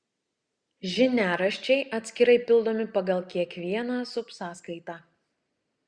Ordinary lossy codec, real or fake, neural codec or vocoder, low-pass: Opus, 64 kbps; real; none; 9.9 kHz